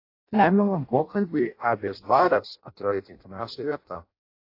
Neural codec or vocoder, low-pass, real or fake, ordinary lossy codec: codec, 16 kHz in and 24 kHz out, 0.6 kbps, FireRedTTS-2 codec; 5.4 kHz; fake; AAC, 32 kbps